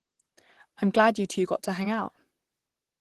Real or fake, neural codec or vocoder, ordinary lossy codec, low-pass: fake; vocoder, 44.1 kHz, 128 mel bands, Pupu-Vocoder; Opus, 16 kbps; 14.4 kHz